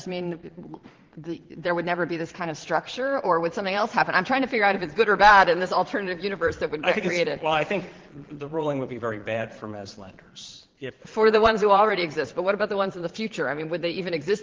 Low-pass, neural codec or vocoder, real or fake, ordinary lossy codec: 7.2 kHz; vocoder, 22.05 kHz, 80 mel bands, Vocos; fake; Opus, 16 kbps